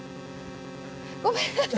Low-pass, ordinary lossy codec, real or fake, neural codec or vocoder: none; none; real; none